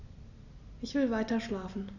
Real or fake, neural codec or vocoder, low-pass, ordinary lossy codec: real; none; 7.2 kHz; none